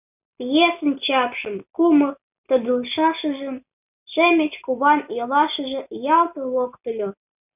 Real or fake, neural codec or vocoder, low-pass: real; none; 3.6 kHz